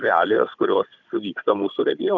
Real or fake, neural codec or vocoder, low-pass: fake; codec, 16 kHz, 16 kbps, FunCodec, trained on Chinese and English, 50 frames a second; 7.2 kHz